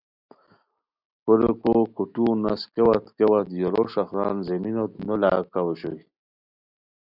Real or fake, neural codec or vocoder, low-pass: real; none; 5.4 kHz